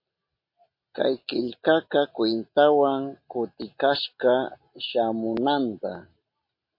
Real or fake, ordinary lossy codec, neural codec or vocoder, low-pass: real; MP3, 32 kbps; none; 5.4 kHz